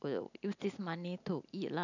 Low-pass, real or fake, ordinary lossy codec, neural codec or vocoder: 7.2 kHz; real; none; none